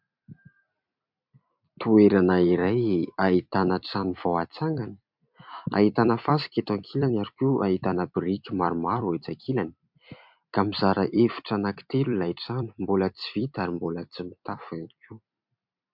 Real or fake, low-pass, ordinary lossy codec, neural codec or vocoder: fake; 5.4 kHz; MP3, 48 kbps; vocoder, 44.1 kHz, 128 mel bands every 256 samples, BigVGAN v2